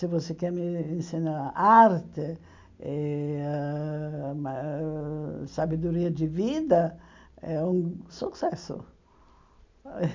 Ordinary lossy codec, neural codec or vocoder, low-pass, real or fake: MP3, 64 kbps; none; 7.2 kHz; real